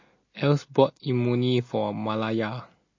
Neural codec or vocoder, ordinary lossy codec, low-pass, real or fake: none; MP3, 32 kbps; 7.2 kHz; real